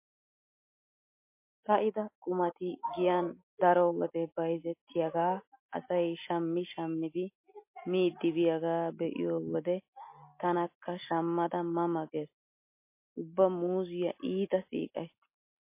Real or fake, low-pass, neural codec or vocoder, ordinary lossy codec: real; 3.6 kHz; none; MP3, 24 kbps